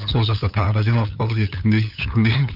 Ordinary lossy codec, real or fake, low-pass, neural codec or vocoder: none; fake; 5.4 kHz; codec, 16 kHz, 8 kbps, FunCodec, trained on LibriTTS, 25 frames a second